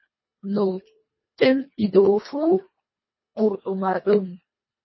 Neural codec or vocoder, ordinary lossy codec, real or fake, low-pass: codec, 24 kHz, 1.5 kbps, HILCodec; MP3, 24 kbps; fake; 7.2 kHz